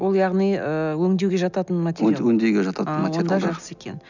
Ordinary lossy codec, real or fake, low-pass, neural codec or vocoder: none; real; 7.2 kHz; none